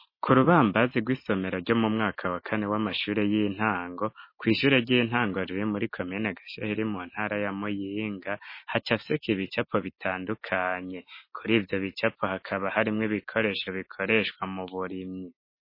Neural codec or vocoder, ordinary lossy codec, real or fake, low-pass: none; MP3, 24 kbps; real; 5.4 kHz